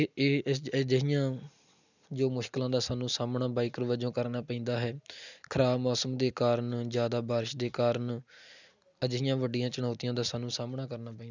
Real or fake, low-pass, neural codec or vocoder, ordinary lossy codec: real; 7.2 kHz; none; none